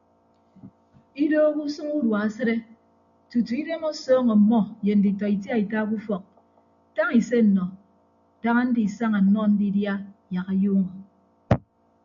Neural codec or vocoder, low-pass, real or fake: none; 7.2 kHz; real